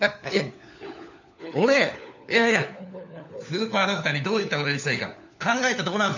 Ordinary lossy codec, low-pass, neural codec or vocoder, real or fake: none; 7.2 kHz; codec, 16 kHz, 4 kbps, FunCodec, trained on LibriTTS, 50 frames a second; fake